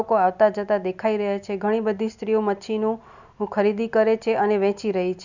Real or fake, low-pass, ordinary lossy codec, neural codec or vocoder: real; 7.2 kHz; none; none